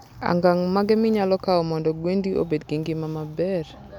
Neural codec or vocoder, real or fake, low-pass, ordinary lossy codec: none; real; 19.8 kHz; none